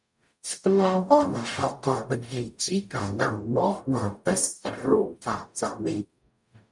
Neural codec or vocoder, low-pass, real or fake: codec, 44.1 kHz, 0.9 kbps, DAC; 10.8 kHz; fake